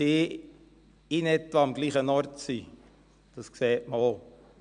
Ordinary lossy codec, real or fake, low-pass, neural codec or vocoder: MP3, 96 kbps; real; 9.9 kHz; none